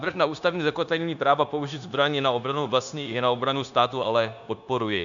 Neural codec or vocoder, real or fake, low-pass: codec, 16 kHz, 0.9 kbps, LongCat-Audio-Codec; fake; 7.2 kHz